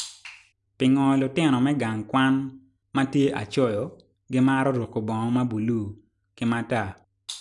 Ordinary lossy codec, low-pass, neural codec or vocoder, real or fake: none; 10.8 kHz; none; real